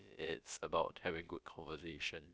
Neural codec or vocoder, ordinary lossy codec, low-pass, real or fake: codec, 16 kHz, about 1 kbps, DyCAST, with the encoder's durations; none; none; fake